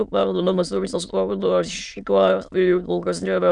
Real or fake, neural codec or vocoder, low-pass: fake; autoencoder, 22.05 kHz, a latent of 192 numbers a frame, VITS, trained on many speakers; 9.9 kHz